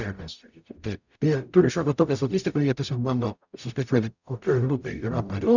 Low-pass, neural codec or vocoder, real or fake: 7.2 kHz; codec, 44.1 kHz, 0.9 kbps, DAC; fake